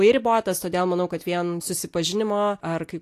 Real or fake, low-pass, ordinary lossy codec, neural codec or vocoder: real; 14.4 kHz; AAC, 64 kbps; none